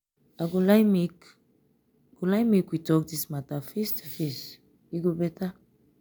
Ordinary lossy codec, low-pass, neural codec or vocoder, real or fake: none; none; none; real